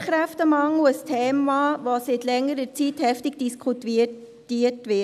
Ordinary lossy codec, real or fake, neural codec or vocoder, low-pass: none; real; none; 14.4 kHz